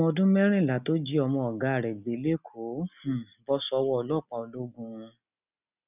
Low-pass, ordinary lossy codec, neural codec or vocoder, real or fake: 3.6 kHz; none; none; real